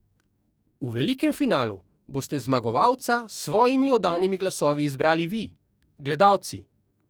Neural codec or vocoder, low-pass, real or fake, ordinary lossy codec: codec, 44.1 kHz, 2.6 kbps, DAC; none; fake; none